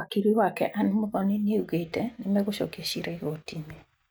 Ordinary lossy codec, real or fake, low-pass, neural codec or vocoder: none; real; none; none